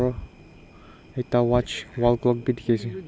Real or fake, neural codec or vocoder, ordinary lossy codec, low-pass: real; none; none; none